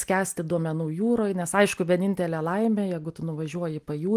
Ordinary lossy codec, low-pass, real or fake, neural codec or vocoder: Opus, 32 kbps; 14.4 kHz; real; none